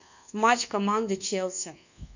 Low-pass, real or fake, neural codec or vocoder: 7.2 kHz; fake; codec, 24 kHz, 1.2 kbps, DualCodec